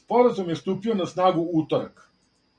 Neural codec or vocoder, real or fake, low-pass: none; real; 9.9 kHz